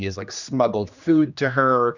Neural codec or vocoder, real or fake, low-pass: codec, 16 kHz, 2 kbps, X-Codec, HuBERT features, trained on general audio; fake; 7.2 kHz